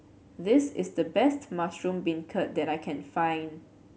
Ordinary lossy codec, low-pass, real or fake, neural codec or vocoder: none; none; real; none